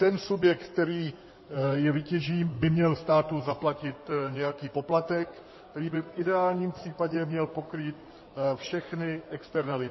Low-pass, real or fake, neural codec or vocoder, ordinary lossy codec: 7.2 kHz; fake; codec, 16 kHz in and 24 kHz out, 2.2 kbps, FireRedTTS-2 codec; MP3, 24 kbps